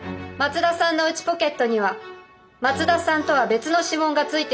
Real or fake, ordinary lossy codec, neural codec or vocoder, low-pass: real; none; none; none